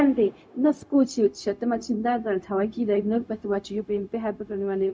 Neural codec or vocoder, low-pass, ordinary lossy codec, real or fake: codec, 16 kHz, 0.4 kbps, LongCat-Audio-Codec; none; none; fake